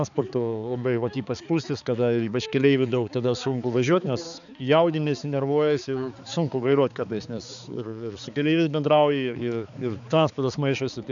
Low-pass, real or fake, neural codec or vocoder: 7.2 kHz; fake; codec, 16 kHz, 4 kbps, X-Codec, HuBERT features, trained on balanced general audio